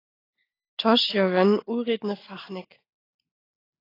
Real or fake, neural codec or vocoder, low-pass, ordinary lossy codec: real; none; 5.4 kHz; AAC, 24 kbps